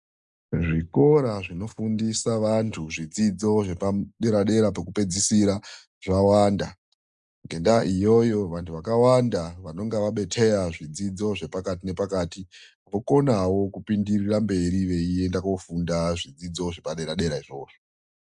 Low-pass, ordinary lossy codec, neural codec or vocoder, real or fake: 10.8 kHz; MP3, 96 kbps; none; real